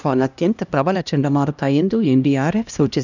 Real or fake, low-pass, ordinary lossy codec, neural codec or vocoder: fake; 7.2 kHz; none; codec, 16 kHz, 1 kbps, X-Codec, HuBERT features, trained on LibriSpeech